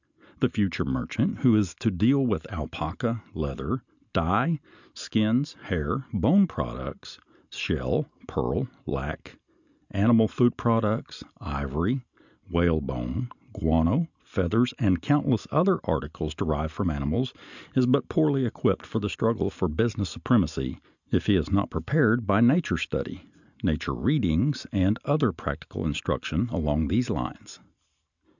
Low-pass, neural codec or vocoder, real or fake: 7.2 kHz; none; real